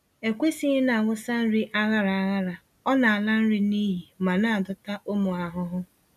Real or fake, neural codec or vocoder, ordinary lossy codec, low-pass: real; none; none; 14.4 kHz